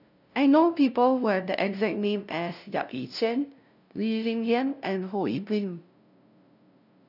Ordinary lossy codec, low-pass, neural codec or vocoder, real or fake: none; 5.4 kHz; codec, 16 kHz, 0.5 kbps, FunCodec, trained on LibriTTS, 25 frames a second; fake